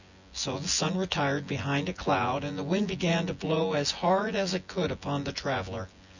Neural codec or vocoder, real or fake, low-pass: vocoder, 24 kHz, 100 mel bands, Vocos; fake; 7.2 kHz